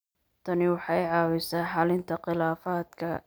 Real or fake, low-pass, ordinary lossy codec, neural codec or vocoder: real; none; none; none